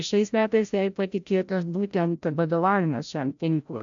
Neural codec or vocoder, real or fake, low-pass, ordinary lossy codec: codec, 16 kHz, 0.5 kbps, FreqCodec, larger model; fake; 7.2 kHz; AAC, 64 kbps